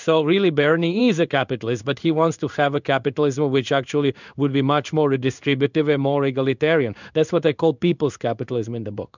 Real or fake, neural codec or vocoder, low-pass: fake; codec, 16 kHz in and 24 kHz out, 1 kbps, XY-Tokenizer; 7.2 kHz